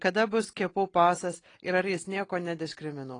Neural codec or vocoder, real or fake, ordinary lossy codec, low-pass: none; real; AAC, 32 kbps; 9.9 kHz